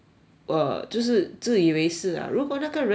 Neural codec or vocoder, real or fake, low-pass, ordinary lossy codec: none; real; none; none